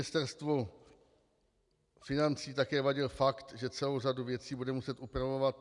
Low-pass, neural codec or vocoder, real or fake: 10.8 kHz; none; real